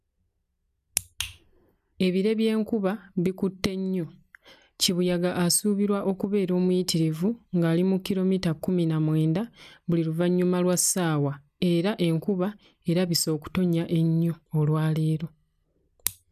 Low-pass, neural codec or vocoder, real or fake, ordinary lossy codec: 14.4 kHz; none; real; none